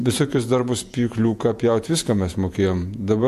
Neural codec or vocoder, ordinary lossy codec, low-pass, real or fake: vocoder, 48 kHz, 128 mel bands, Vocos; MP3, 64 kbps; 14.4 kHz; fake